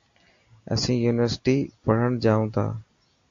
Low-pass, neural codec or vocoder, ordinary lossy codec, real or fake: 7.2 kHz; none; AAC, 64 kbps; real